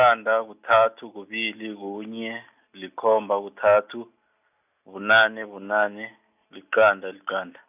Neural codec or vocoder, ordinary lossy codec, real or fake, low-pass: none; none; real; 3.6 kHz